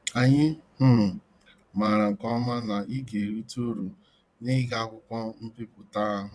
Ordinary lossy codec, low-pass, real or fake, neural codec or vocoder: none; none; fake; vocoder, 22.05 kHz, 80 mel bands, WaveNeXt